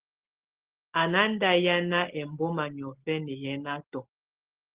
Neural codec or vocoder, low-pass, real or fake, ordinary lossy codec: none; 3.6 kHz; real; Opus, 16 kbps